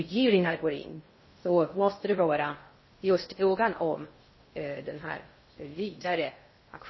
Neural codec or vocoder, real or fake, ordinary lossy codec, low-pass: codec, 16 kHz in and 24 kHz out, 0.6 kbps, FocalCodec, streaming, 2048 codes; fake; MP3, 24 kbps; 7.2 kHz